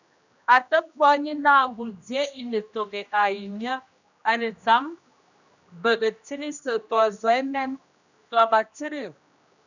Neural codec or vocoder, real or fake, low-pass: codec, 16 kHz, 1 kbps, X-Codec, HuBERT features, trained on general audio; fake; 7.2 kHz